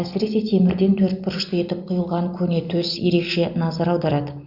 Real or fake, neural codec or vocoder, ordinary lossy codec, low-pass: real; none; Opus, 64 kbps; 5.4 kHz